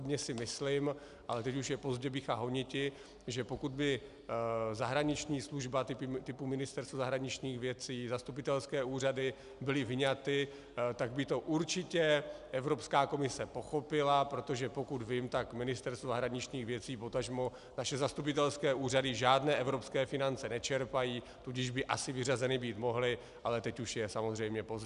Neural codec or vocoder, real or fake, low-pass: none; real; 10.8 kHz